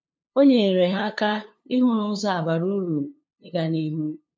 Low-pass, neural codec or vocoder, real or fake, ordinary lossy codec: none; codec, 16 kHz, 2 kbps, FunCodec, trained on LibriTTS, 25 frames a second; fake; none